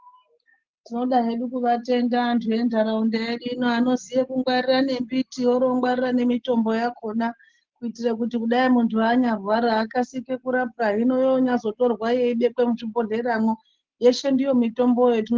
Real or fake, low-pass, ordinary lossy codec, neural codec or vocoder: real; 7.2 kHz; Opus, 16 kbps; none